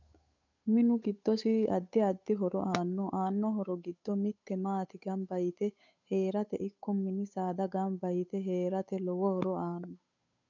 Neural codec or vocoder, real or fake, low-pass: codec, 16 kHz, 8 kbps, FunCodec, trained on Chinese and English, 25 frames a second; fake; 7.2 kHz